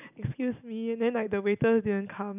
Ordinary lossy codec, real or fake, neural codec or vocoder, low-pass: none; real; none; 3.6 kHz